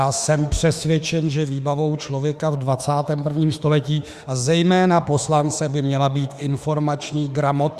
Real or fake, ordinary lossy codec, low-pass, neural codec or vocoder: fake; Opus, 64 kbps; 14.4 kHz; autoencoder, 48 kHz, 32 numbers a frame, DAC-VAE, trained on Japanese speech